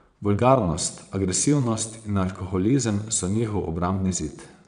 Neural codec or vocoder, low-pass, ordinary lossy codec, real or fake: vocoder, 22.05 kHz, 80 mel bands, Vocos; 9.9 kHz; none; fake